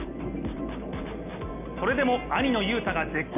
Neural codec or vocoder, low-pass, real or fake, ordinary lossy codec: none; 3.6 kHz; real; none